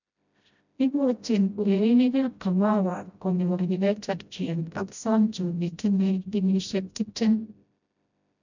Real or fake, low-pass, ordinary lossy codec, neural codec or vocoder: fake; 7.2 kHz; none; codec, 16 kHz, 0.5 kbps, FreqCodec, smaller model